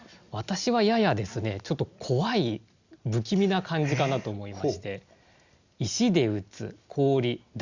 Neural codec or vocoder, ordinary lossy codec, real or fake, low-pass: none; Opus, 64 kbps; real; 7.2 kHz